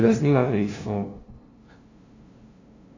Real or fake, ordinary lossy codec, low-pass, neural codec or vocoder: fake; AAC, 48 kbps; 7.2 kHz; codec, 16 kHz, 0.5 kbps, FunCodec, trained on LibriTTS, 25 frames a second